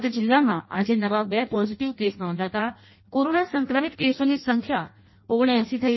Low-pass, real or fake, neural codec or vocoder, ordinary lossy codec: 7.2 kHz; fake; codec, 16 kHz in and 24 kHz out, 0.6 kbps, FireRedTTS-2 codec; MP3, 24 kbps